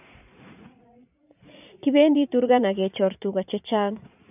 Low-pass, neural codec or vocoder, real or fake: 3.6 kHz; vocoder, 44.1 kHz, 80 mel bands, Vocos; fake